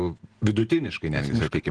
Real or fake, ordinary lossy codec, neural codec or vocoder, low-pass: real; Opus, 16 kbps; none; 9.9 kHz